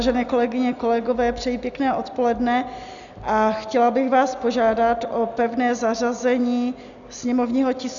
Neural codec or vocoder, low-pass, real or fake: none; 7.2 kHz; real